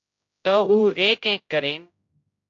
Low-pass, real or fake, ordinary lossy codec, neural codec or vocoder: 7.2 kHz; fake; AAC, 48 kbps; codec, 16 kHz, 0.5 kbps, X-Codec, HuBERT features, trained on general audio